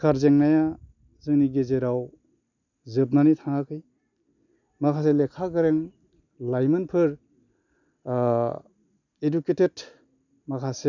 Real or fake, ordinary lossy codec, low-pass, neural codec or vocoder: real; none; 7.2 kHz; none